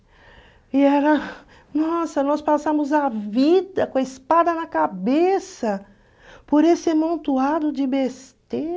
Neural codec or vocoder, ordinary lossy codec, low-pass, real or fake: none; none; none; real